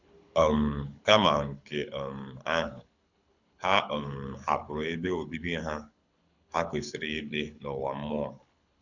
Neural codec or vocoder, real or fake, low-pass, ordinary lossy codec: codec, 24 kHz, 6 kbps, HILCodec; fake; 7.2 kHz; none